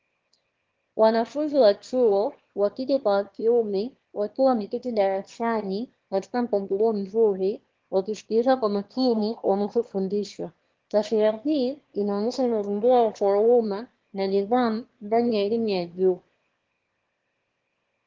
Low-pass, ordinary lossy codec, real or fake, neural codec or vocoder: 7.2 kHz; Opus, 16 kbps; fake; autoencoder, 22.05 kHz, a latent of 192 numbers a frame, VITS, trained on one speaker